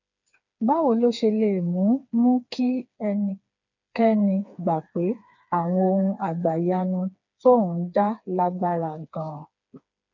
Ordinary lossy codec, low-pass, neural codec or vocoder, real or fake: none; 7.2 kHz; codec, 16 kHz, 4 kbps, FreqCodec, smaller model; fake